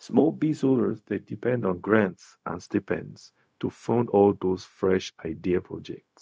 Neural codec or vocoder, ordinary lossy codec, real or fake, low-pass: codec, 16 kHz, 0.4 kbps, LongCat-Audio-Codec; none; fake; none